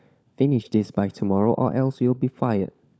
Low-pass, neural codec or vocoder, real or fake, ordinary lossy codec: none; codec, 16 kHz, 16 kbps, FunCodec, trained on LibriTTS, 50 frames a second; fake; none